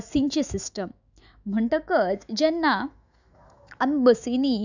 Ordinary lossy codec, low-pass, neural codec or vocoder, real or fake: none; 7.2 kHz; autoencoder, 48 kHz, 128 numbers a frame, DAC-VAE, trained on Japanese speech; fake